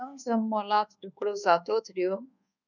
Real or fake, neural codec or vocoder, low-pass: fake; codec, 24 kHz, 1.2 kbps, DualCodec; 7.2 kHz